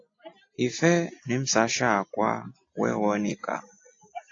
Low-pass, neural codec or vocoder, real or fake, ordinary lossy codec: 7.2 kHz; none; real; AAC, 48 kbps